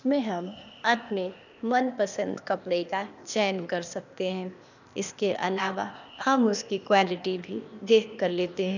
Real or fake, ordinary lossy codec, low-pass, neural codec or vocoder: fake; none; 7.2 kHz; codec, 16 kHz, 0.8 kbps, ZipCodec